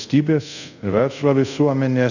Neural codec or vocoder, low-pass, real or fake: codec, 24 kHz, 0.5 kbps, DualCodec; 7.2 kHz; fake